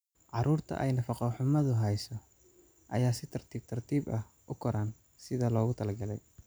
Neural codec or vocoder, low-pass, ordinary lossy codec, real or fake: none; none; none; real